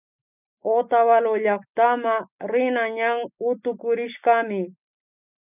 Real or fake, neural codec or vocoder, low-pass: real; none; 3.6 kHz